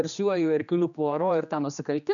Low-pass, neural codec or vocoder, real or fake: 7.2 kHz; codec, 16 kHz, 2 kbps, X-Codec, HuBERT features, trained on general audio; fake